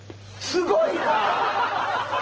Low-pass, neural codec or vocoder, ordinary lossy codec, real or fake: 7.2 kHz; none; Opus, 16 kbps; real